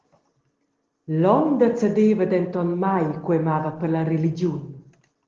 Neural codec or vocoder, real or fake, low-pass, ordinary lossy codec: none; real; 7.2 kHz; Opus, 16 kbps